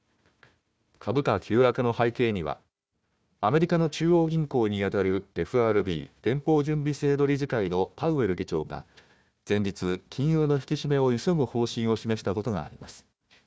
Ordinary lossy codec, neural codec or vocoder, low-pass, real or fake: none; codec, 16 kHz, 1 kbps, FunCodec, trained on Chinese and English, 50 frames a second; none; fake